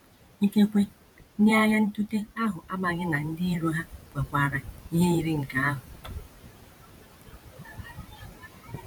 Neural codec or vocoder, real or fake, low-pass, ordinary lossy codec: vocoder, 44.1 kHz, 128 mel bands every 512 samples, BigVGAN v2; fake; 19.8 kHz; none